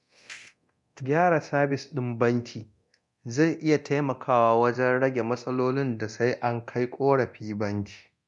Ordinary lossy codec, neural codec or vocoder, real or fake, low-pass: none; codec, 24 kHz, 0.9 kbps, DualCodec; fake; 10.8 kHz